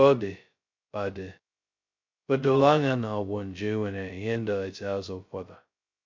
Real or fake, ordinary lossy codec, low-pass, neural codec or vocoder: fake; MP3, 64 kbps; 7.2 kHz; codec, 16 kHz, 0.2 kbps, FocalCodec